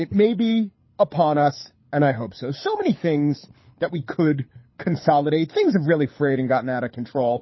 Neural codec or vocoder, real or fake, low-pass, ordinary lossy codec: codec, 24 kHz, 6 kbps, HILCodec; fake; 7.2 kHz; MP3, 24 kbps